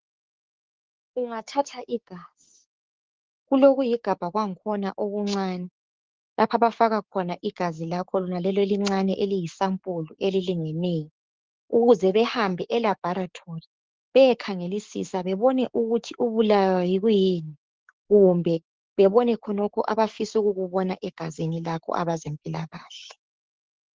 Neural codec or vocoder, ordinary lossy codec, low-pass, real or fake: codec, 16 kHz, 6 kbps, DAC; Opus, 16 kbps; 7.2 kHz; fake